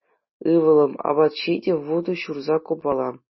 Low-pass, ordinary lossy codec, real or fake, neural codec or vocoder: 7.2 kHz; MP3, 24 kbps; real; none